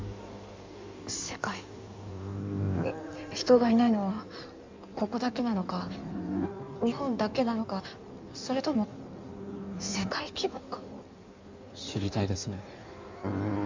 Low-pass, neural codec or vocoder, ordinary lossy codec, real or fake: 7.2 kHz; codec, 16 kHz in and 24 kHz out, 1.1 kbps, FireRedTTS-2 codec; MP3, 64 kbps; fake